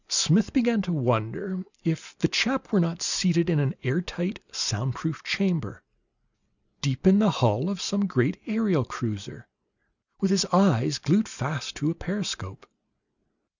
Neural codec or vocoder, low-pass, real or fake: none; 7.2 kHz; real